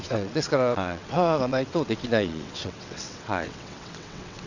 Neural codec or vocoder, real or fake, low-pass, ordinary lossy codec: vocoder, 22.05 kHz, 80 mel bands, WaveNeXt; fake; 7.2 kHz; none